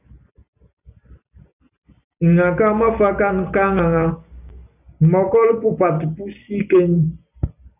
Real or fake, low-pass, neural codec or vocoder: real; 3.6 kHz; none